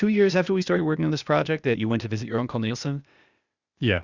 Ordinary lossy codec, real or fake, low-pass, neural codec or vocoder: Opus, 64 kbps; fake; 7.2 kHz; codec, 16 kHz, 0.8 kbps, ZipCodec